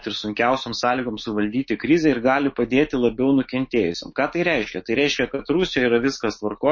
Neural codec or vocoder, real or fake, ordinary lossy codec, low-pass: autoencoder, 48 kHz, 128 numbers a frame, DAC-VAE, trained on Japanese speech; fake; MP3, 32 kbps; 7.2 kHz